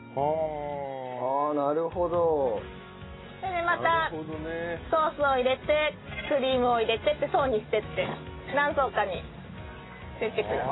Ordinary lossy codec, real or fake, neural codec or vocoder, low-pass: AAC, 16 kbps; real; none; 7.2 kHz